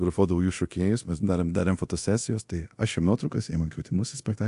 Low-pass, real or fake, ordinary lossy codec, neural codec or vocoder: 10.8 kHz; fake; AAC, 64 kbps; codec, 24 kHz, 0.9 kbps, DualCodec